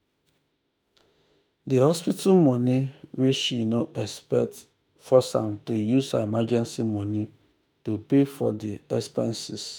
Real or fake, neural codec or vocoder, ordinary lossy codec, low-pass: fake; autoencoder, 48 kHz, 32 numbers a frame, DAC-VAE, trained on Japanese speech; none; none